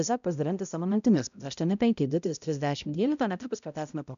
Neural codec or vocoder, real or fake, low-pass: codec, 16 kHz, 0.5 kbps, X-Codec, HuBERT features, trained on balanced general audio; fake; 7.2 kHz